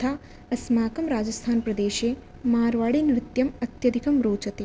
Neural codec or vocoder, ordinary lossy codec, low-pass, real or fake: none; none; none; real